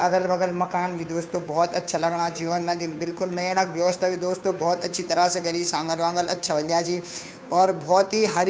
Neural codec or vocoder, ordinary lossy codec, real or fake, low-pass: codec, 16 kHz, 2 kbps, FunCodec, trained on Chinese and English, 25 frames a second; none; fake; none